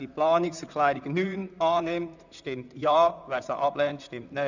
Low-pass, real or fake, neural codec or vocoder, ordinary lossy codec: 7.2 kHz; fake; vocoder, 44.1 kHz, 128 mel bands, Pupu-Vocoder; none